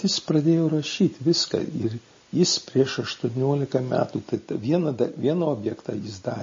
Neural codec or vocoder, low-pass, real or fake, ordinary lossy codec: none; 7.2 kHz; real; MP3, 32 kbps